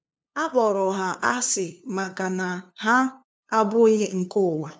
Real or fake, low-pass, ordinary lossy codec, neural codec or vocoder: fake; none; none; codec, 16 kHz, 2 kbps, FunCodec, trained on LibriTTS, 25 frames a second